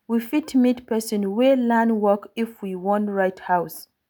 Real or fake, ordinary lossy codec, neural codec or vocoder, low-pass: real; none; none; none